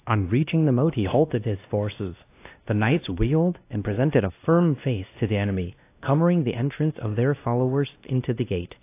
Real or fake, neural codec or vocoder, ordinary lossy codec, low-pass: fake; codec, 16 kHz, 1 kbps, X-Codec, WavLM features, trained on Multilingual LibriSpeech; AAC, 24 kbps; 3.6 kHz